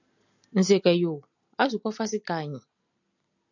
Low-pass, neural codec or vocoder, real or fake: 7.2 kHz; none; real